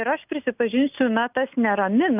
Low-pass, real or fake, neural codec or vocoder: 3.6 kHz; real; none